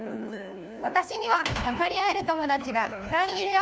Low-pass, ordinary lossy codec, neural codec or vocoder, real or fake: none; none; codec, 16 kHz, 1 kbps, FunCodec, trained on LibriTTS, 50 frames a second; fake